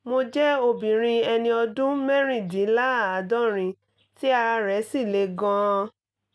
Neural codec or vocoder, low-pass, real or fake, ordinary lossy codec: none; none; real; none